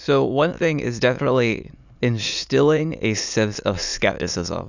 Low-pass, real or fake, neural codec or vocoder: 7.2 kHz; fake; autoencoder, 22.05 kHz, a latent of 192 numbers a frame, VITS, trained on many speakers